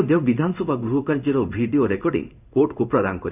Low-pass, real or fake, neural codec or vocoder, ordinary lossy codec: 3.6 kHz; fake; codec, 16 kHz in and 24 kHz out, 1 kbps, XY-Tokenizer; none